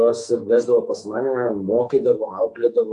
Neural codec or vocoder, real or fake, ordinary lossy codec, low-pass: codec, 44.1 kHz, 2.6 kbps, SNAC; fake; AAC, 64 kbps; 10.8 kHz